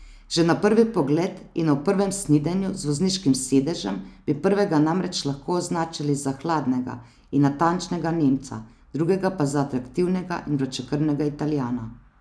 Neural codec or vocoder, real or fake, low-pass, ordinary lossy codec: none; real; none; none